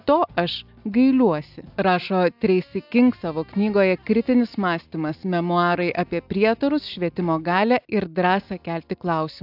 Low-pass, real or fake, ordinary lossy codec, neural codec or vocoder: 5.4 kHz; real; AAC, 48 kbps; none